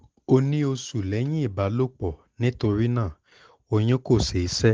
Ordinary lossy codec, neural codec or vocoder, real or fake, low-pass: Opus, 16 kbps; none; real; 7.2 kHz